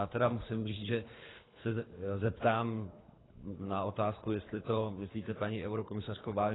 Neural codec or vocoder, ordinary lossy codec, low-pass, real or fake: codec, 24 kHz, 3 kbps, HILCodec; AAC, 16 kbps; 7.2 kHz; fake